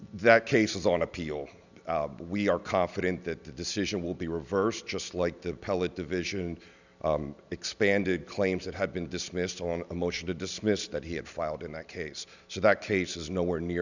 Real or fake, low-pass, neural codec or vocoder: real; 7.2 kHz; none